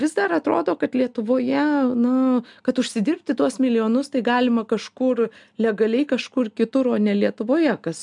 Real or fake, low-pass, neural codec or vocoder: real; 10.8 kHz; none